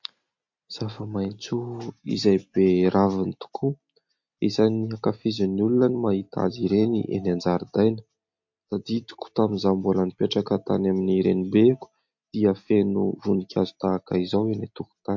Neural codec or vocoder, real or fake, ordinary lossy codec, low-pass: none; real; MP3, 48 kbps; 7.2 kHz